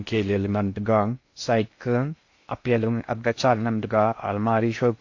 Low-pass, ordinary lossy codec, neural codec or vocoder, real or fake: 7.2 kHz; AAC, 48 kbps; codec, 16 kHz in and 24 kHz out, 0.6 kbps, FocalCodec, streaming, 2048 codes; fake